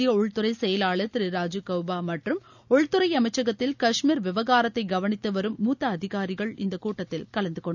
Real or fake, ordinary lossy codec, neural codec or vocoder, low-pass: real; none; none; 7.2 kHz